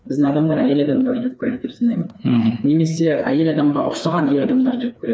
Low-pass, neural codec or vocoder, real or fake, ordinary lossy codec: none; codec, 16 kHz, 2 kbps, FreqCodec, larger model; fake; none